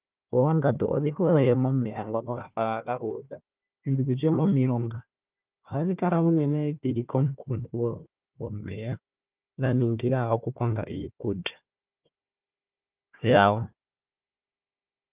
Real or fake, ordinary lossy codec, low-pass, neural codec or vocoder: fake; Opus, 24 kbps; 3.6 kHz; codec, 16 kHz, 1 kbps, FunCodec, trained on Chinese and English, 50 frames a second